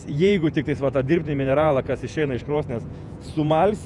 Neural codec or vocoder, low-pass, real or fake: vocoder, 48 kHz, 128 mel bands, Vocos; 10.8 kHz; fake